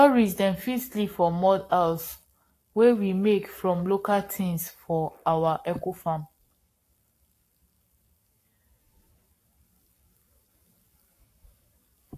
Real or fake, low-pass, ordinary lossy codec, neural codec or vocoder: fake; 14.4 kHz; AAC, 48 kbps; codec, 44.1 kHz, 7.8 kbps, Pupu-Codec